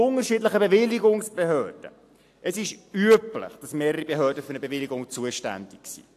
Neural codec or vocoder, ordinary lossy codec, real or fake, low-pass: none; AAC, 64 kbps; real; 14.4 kHz